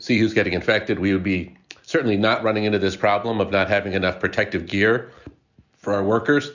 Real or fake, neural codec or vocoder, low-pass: real; none; 7.2 kHz